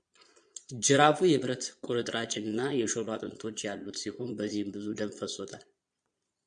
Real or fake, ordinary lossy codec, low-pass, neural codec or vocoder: fake; MP3, 64 kbps; 9.9 kHz; vocoder, 22.05 kHz, 80 mel bands, Vocos